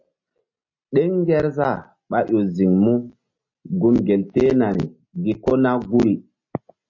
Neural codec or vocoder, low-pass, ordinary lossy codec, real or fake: none; 7.2 kHz; MP3, 32 kbps; real